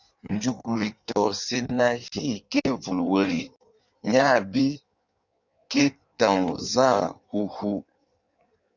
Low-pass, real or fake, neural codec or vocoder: 7.2 kHz; fake; codec, 16 kHz in and 24 kHz out, 1.1 kbps, FireRedTTS-2 codec